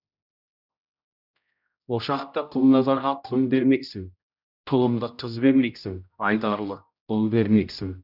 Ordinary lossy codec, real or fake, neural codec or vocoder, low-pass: none; fake; codec, 16 kHz, 0.5 kbps, X-Codec, HuBERT features, trained on general audio; 5.4 kHz